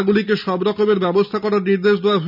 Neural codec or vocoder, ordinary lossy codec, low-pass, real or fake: none; none; 5.4 kHz; real